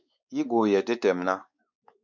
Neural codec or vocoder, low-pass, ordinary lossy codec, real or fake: codec, 16 kHz, 4 kbps, X-Codec, WavLM features, trained on Multilingual LibriSpeech; 7.2 kHz; MP3, 64 kbps; fake